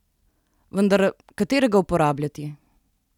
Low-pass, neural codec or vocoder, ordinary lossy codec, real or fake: 19.8 kHz; none; none; real